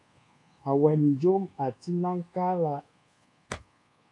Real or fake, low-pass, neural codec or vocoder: fake; 10.8 kHz; codec, 24 kHz, 1.2 kbps, DualCodec